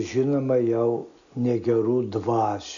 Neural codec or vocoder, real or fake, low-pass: none; real; 7.2 kHz